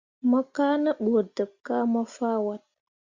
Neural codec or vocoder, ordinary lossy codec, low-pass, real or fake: none; Opus, 64 kbps; 7.2 kHz; real